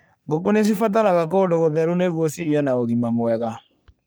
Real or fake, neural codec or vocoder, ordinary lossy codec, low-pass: fake; codec, 44.1 kHz, 3.4 kbps, Pupu-Codec; none; none